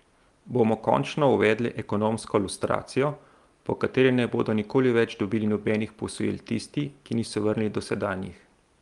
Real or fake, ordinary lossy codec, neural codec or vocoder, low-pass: real; Opus, 32 kbps; none; 10.8 kHz